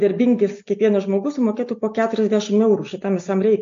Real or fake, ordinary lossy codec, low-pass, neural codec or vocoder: real; AAC, 48 kbps; 7.2 kHz; none